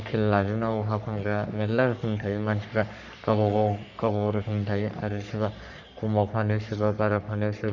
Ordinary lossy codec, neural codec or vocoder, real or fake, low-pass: none; codec, 44.1 kHz, 3.4 kbps, Pupu-Codec; fake; 7.2 kHz